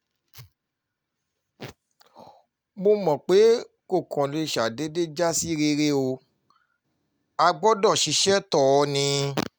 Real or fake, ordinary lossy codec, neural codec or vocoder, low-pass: real; none; none; none